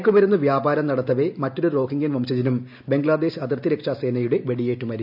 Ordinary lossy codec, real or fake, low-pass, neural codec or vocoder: none; real; 5.4 kHz; none